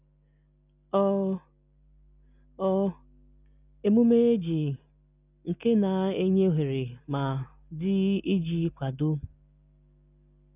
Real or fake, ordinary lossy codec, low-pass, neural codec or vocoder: real; none; 3.6 kHz; none